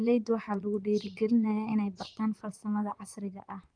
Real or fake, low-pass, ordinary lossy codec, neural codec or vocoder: fake; 9.9 kHz; Opus, 32 kbps; vocoder, 44.1 kHz, 128 mel bands, Pupu-Vocoder